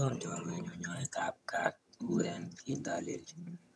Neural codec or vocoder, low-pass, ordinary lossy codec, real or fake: vocoder, 22.05 kHz, 80 mel bands, HiFi-GAN; none; none; fake